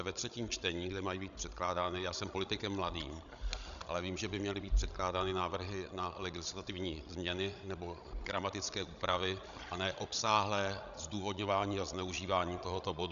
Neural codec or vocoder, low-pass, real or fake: codec, 16 kHz, 16 kbps, FreqCodec, larger model; 7.2 kHz; fake